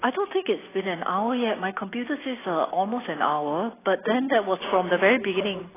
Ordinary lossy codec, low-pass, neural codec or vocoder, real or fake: AAC, 16 kbps; 3.6 kHz; none; real